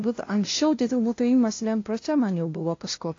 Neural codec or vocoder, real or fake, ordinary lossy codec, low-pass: codec, 16 kHz, 0.5 kbps, FunCodec, trained on LibriTTS, 25 frames a second; fake; AAC, 32 kbps; 7.2 kHz